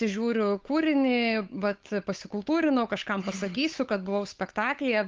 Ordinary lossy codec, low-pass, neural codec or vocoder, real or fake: Opus, 32 kbps; 7.2 kHz; codec, 16 kHz, 8 kbps, FunCodec, trained on Chinese and English, 25 frames a second; fake